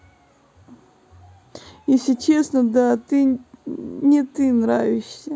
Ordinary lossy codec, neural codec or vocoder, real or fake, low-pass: none; none; real; none